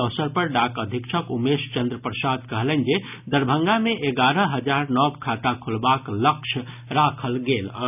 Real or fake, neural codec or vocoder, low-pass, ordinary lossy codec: real; none; 3.6 kHz; none